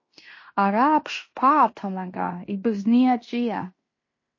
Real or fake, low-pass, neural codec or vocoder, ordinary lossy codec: fake; 7.2 kHz; codec, 16 kHz in and 24 kHz out, 0.9 kbps, LongCat-Audio-Codec, fine tuned four codebook decoder; MP3, 32 kbps